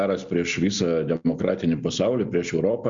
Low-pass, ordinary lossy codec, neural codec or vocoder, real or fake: 7.2 kHz; Opus, 64 kbps; none; real